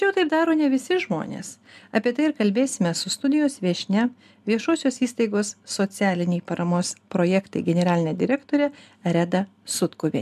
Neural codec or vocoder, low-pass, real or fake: none; 14.4 kHz; real